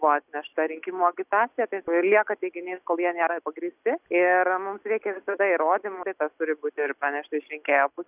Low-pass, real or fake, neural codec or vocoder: 3.6 kHz; real; none